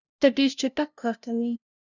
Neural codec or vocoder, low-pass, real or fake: codec, 16 kHz, 0.5 kbps, FunCodec, trained on LibriTTS, 25 frames a second; 7.2 kHz; fake